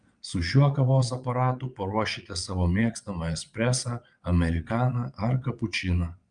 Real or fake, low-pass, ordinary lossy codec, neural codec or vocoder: fake; 9.9 kHz; Opus, 32 kbps; vocoder, 22.05 kHz, 80 mel bands, Vocos